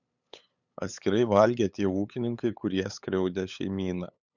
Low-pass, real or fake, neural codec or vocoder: 7.2 kHz; fake; codec, 16 kHz, 8 kbps, FunCodec, trained on LibriTTS, 25 frames a second